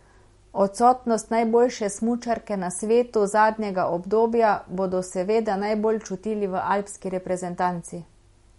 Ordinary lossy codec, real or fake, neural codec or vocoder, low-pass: MP3, 48 kbps; real; none; 19.8 kHz